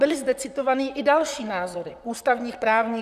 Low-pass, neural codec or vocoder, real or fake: 14.4 kHz; vocoder, 44.1 kHz, 128 mel bands, Pupu-Vocoder; fake